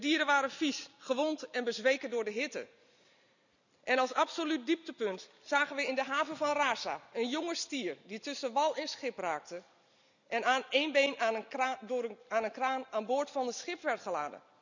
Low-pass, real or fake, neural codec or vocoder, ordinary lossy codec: 7.2 kHz; real; none; none